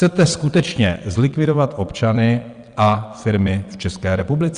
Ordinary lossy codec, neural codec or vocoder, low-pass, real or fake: Opus, 64 kbps; vocoder, 22.05 kHz, 80 mel bands, WaveNeXt; 9.9 kHz; fake